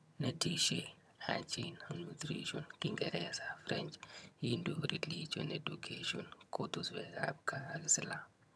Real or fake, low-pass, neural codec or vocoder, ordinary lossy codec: fake; none; vocoder, 22.05 kHz, 80 mel bands, HiFi-GAN; none